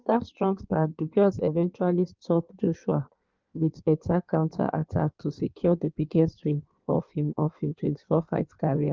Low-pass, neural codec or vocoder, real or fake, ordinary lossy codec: 7.2 kHz; codec, 16 kHz in and 24 kHz out, 1.1 kbps, FireRedTTS-2 codec; fake; Opus, 24 kbps